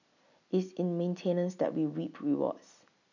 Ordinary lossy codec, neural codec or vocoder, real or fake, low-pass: none; none; real; 7.2 kHz